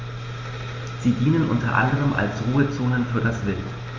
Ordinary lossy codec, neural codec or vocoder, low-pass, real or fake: Opus, 32 kbps; none; 7.2 kHz; real